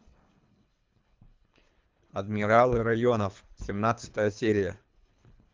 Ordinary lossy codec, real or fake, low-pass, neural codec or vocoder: Opus, 24 kbps; fake; 7.2 kHz; codec, 24 kHz, 3 kbps, HILCodec